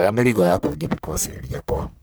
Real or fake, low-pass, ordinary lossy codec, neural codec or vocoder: fake; none; none; codec, 44.1 kHz, 1.7 kbps, Pupu-Codec